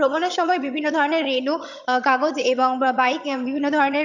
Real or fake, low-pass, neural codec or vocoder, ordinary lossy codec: fake; 7.2 kHz; vocoder, 22.05 kHz, 80 mel bands, HiFi-GAN; none